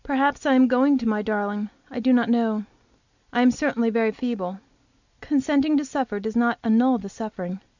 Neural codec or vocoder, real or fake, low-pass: none; real; 7.2 kHz